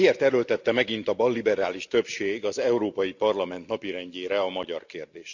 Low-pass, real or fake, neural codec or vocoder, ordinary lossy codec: 7.2 kHz; real; none; Opus, 64 kbps